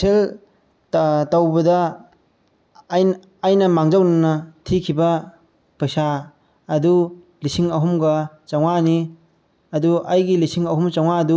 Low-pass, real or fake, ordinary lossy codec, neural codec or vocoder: none; real; none; none